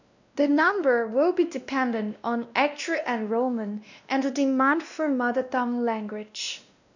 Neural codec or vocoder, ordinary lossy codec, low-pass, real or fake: codec, 16 kHz, 1 kbps, X-Codec, WavLM features, trained on Multilingual LibriSpeech; none; 7.2 kHz; fake